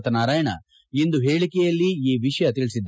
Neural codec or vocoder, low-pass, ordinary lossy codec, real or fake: none; none; none; real